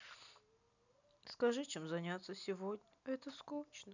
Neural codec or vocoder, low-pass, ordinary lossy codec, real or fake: none; 7.2 kHz; none; real